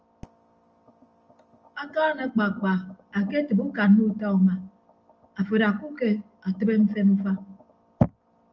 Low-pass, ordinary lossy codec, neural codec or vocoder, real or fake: 7.2 kHz; Opus, 24 kbps; none; real